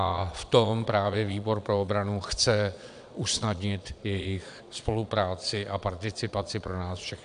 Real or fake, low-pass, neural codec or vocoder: fake; 9.9 kHz; vocoder, 22.05 kHz, 80 mel bands, Vocos